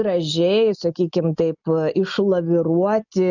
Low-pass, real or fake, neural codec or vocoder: 7.2 kHz; real; none